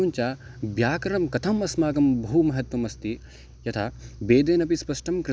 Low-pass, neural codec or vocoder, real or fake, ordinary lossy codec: none; none; real; none